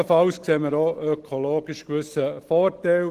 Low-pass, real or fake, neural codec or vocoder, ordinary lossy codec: 14.4 kHz; real; none; Opus, 16 kbps